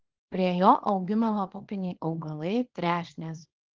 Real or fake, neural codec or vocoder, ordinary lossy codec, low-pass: fake; codec, 24 kHz, 0.9 kbps, WavTokenizer, small release; Opus, 16 kbps; 7.2 kHz